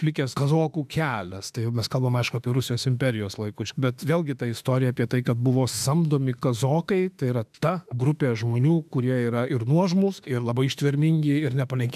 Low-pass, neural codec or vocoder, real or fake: 14.4 kHz; autoencoder, 48 kHz, 32 numbers a frame, DAC-VAE, trained on Japanese speech; fake